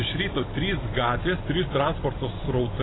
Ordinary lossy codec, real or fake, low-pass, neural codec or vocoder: AAC, 16 kbps; real; 7.2 kHz; none